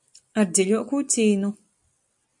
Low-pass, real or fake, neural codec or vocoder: 10.8 kHz; real; none